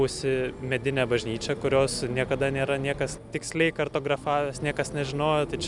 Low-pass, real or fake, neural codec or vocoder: 10.8 kHz; real; none